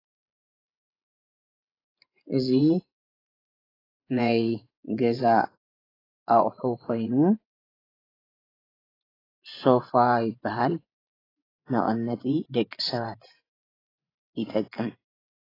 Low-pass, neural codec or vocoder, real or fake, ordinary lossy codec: 5.4 kHz; vocoder, 24 kHz, 100 mel bands, Vocos; fake; AAC, 24 kbps